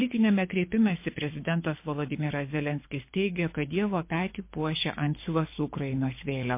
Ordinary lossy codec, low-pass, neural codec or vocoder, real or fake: MP3, 24 kbps; 3.6 kHz; codec, 24 kHz, 6 kbps, HILCodec; fake